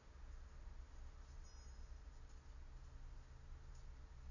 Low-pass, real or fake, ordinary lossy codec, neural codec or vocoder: 7.2 kHz; real; none; none